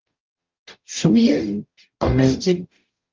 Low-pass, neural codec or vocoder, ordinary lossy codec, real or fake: 7.2 kHz; codec, 44.1 kHz, 0.9 kbps, DAC; Opus, 24 kbps; fake